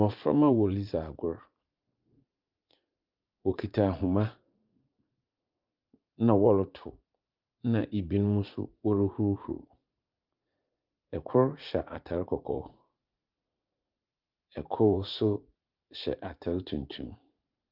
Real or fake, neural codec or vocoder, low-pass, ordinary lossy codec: fake; vocoder, 44.1 kHz, 80 mel bands, Vocos; 5.4 kHz; Opus, 24 kbps